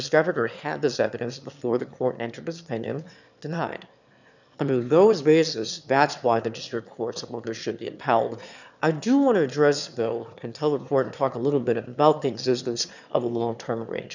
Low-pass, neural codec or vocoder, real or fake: 7.2 kHz; autoencoder, 22.05 kHz, a latent of 192 numbers a frame, VITS, trained on one speaker; fake